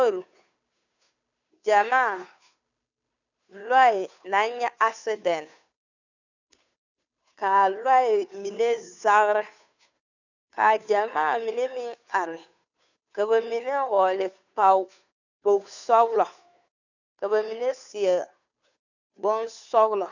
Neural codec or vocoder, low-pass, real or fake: codec, 16 kHz, 2 kbps, FunCodec, trained on Chinese and English, 25 frames a second; 7.2 kHz; fake